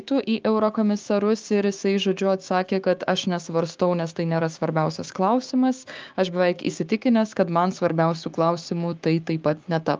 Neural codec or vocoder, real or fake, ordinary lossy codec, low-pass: codec, 16 kHz, 6 kbps, DAC; fake; Opus, 32 kbps; 7.2 kHz